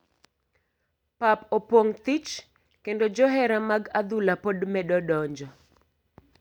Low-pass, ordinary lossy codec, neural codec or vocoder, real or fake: 19.8 kHz; none; none; real